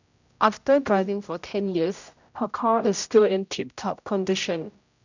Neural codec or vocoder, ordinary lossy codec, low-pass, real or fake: codec, 16 kHz, 0.5 kbps, X-Codec, HuBERT features, trained on general audio; none; 7.2 kHz; fake